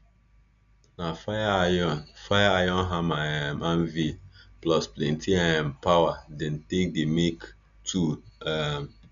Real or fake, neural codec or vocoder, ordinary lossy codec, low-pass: real; none; none; 7.2 kHz